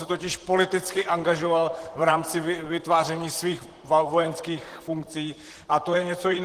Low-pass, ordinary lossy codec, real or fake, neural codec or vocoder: 14.4 kHz; Opus, 16 kbps; fake; vocoder, 44.1 kHz, 128 mel bands, Pupu-Vocoder